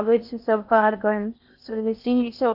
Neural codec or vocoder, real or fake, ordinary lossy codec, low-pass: codec, 16 kHz in and 24 kHz out, 0.6 kbps, FocalCodec, streaming, 4096 codes; fake; none; 5.4 kHz